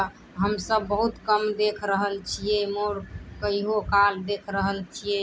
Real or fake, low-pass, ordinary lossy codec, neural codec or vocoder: real; none; none; none